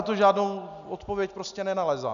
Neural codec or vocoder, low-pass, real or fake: none; 7.2 kHz; real